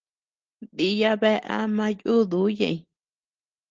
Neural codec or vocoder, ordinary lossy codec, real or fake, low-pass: none; Opus, 16 kbps; real; 7.2 kHz